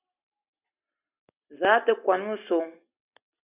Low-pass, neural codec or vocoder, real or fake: 3.6 kHz; none; real